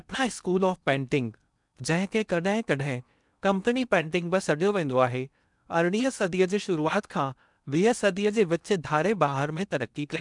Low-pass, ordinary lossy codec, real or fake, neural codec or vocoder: 10.8 kHz; none; fake; codec, 16 kHz in and 24 kHz out, 0.8 kbps, FocalCodec, streaming, 65536 codes